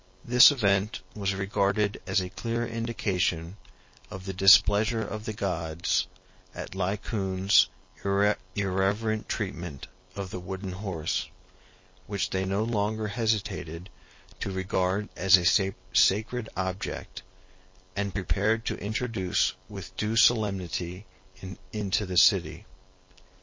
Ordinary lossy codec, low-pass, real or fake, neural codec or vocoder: MP3, 32 kbps; 7.2 kHz; real; none